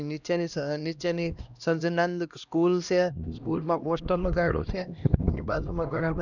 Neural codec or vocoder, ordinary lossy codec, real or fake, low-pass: codec, 16 kHz, 1 kbps, X-Codec, HuBERT features, trained on LibriSpeech; none; fake; 7.2 kHz